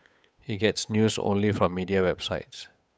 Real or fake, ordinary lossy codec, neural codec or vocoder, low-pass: fake; none; codec, 16 kHz, 8 kbps, FunCodec, trained on Chinese and English, 25 frames a second; none